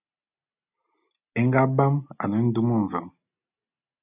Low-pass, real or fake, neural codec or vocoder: 3.6 kHz; real; none